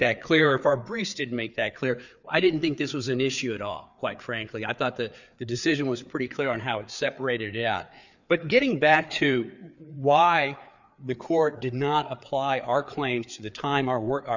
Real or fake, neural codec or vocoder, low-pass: fake; codec, 16 kHz, 4 kbps, FreqCodec, larger model; 7.2 kHz